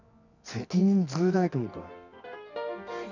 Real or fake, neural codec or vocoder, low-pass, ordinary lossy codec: fake; codec, 24 kHz, 0.9 kbps, WavTokenizer, medium music audio release; 7.2 kHz; none